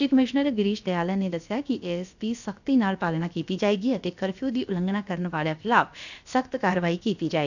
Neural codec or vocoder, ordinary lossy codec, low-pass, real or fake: codec, 16 kHz, about 1 kbps, DyCAST, with the encoder's durations; none; 7.2 kHz; fake